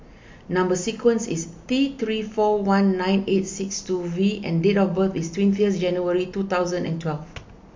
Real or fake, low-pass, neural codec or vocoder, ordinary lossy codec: real; 7.2 kHz; none; MP3, 48 kbps